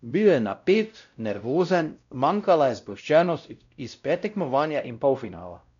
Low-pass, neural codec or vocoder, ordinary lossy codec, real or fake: 7.2 kHz; codec, 16 kHz, 0.5 kbps, X-Codec, WavLM features, trained on Multilingual LibriSpeech; none; fake